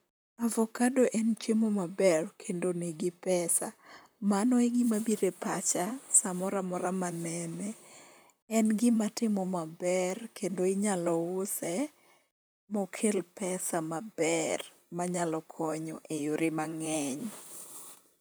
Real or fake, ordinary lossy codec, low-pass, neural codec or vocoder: fake; none; none; vocoder, 44.1 kHz, 128 mel bands, Pupu-Vocoder